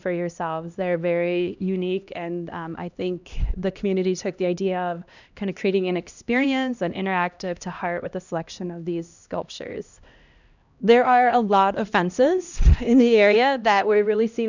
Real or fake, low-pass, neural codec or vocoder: fake; 7.2 kHz; codec, 16 kHz, 1 kbps, X-Codec, HuBERT features, trained on LibriSpeech